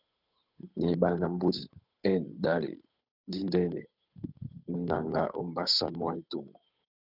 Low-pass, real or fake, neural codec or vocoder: 5.4 kHz; fake; codec, 16 kHz, 8 kbps, FunCodec, trained on Chinese and English, 25 frames a second